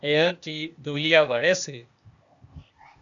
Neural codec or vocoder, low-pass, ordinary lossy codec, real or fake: codec, 16 kHz, 0.8 kbps, ZipCodec; 7.2 kHz; MP3, 96 kbps; fake